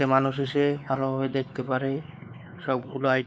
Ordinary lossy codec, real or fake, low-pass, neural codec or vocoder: none; fake; none; codec, 16 kHz, 4 kbps, X-Codec, WavLM features, trained on Multilingual LibriSpeech